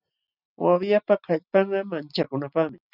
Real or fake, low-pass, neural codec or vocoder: real; 5.4 kHz; none